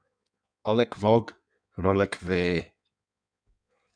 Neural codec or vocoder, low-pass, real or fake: codec, 16 kHz in and 24 kHz out, 1.1 kbps, FireRedTTS-2 codec; 9.9 kHz; fake